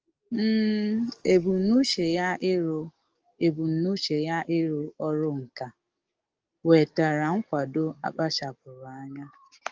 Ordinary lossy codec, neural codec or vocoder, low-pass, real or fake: Opus, 24 kbps; codec, 44.1 kHz, 7.8 kbps, DAC; 7.2 kHz; fake